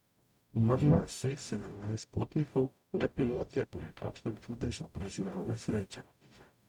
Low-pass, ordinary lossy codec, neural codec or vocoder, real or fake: 19.8 kHz; none; codec, 44.1 kHz, 0.9 kbps, DAC; fake